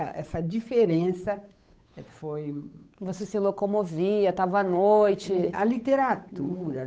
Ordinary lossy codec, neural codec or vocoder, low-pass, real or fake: none; codec, 16 kHz, 8 kbps, FunCodec, trained on Chinese and English, 25 frames a second; none; fake